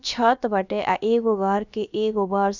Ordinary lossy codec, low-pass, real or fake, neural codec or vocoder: none; 7.2 kHz; fake; codec, 16 kHz, about 1 kbps, DyCAST, with the encoder's durations